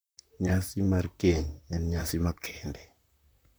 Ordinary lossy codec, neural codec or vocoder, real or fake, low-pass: none; vocoder, 44.1 kHz, 128 mel bands, Pupu-Vocoder; fake; none